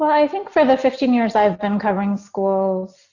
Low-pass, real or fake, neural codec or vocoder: 7.2 kHz; real; none